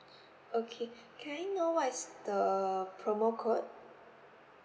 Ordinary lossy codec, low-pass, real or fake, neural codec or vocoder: none; none; real; none